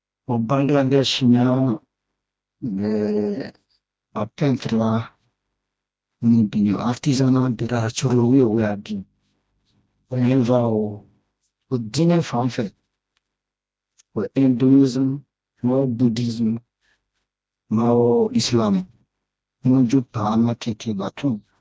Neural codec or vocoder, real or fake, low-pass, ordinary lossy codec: codec, 16 kHz, 1 kbps, FreqCodec, smaller model; fake; none; none